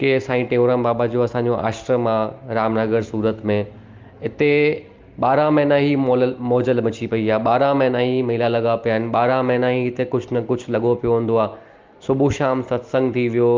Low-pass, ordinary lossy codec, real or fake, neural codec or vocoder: 7.2 kHz; Opus, 32 kbps; real; none